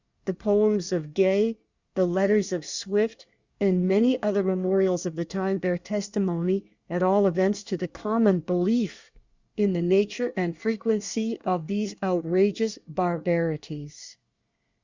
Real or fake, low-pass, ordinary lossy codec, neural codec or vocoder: fake; 7.2 kHz; Opus, 64 kbps; codec, 24 kHz, 1 kbps, SNAC